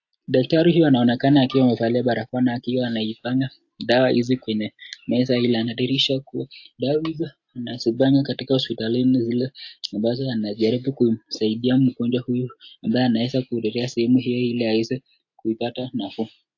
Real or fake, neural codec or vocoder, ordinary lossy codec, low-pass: real; none; AAC, 48 kbps; 7.2 kHz